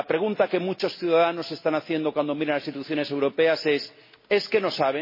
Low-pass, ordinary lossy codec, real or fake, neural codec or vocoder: 5.4 kHz; MP3, 24 kbps; real; none